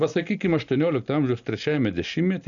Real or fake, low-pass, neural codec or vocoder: real; 7.2 kHz; none